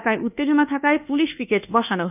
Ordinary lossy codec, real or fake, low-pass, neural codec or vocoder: none; fake; 3.6 kHz; codec, 24 kHz, 1.2 kbps, DualCodec